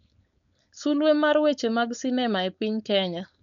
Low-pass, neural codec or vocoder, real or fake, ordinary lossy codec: 7.2 kHz; codec, 16 kHz, 4.8 kbps, FACodec; fake; none